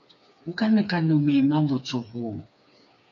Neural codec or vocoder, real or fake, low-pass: codec, 16 kHz, 4 kbps, FreqCodec, smaller model; fake; 7.2 kHz